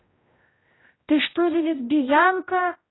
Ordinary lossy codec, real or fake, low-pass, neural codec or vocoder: AAC, 16 kbps; fake; 7.2 kHz; codec, 16 kHz, 0.5 kbps, X-Codec, HuBERT features, trained on general audio